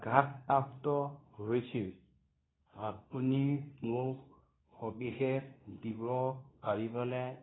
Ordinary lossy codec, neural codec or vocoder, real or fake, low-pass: AAC, 16 kbps; codec, 24 kHz, 0.9 kbps, WavTokenizer, medium speech release version 2; fake; 7.2 kHz